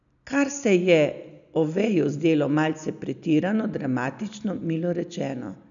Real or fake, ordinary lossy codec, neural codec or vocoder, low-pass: real; none; none; 7.2 kHz